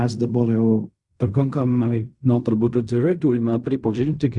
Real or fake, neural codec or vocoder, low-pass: fake; codec, 16 kHz in and 24 kHz out, 0.4 kbps, LongCat-Audio-Codec, fine tuned four codebook decoder; 10.8 kHz